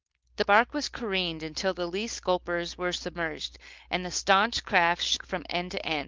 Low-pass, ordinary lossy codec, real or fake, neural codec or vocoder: 7.2 kHz; Opus, 32 kbps; fake; codec, 16 kHz, 4.8 kbps, FACodec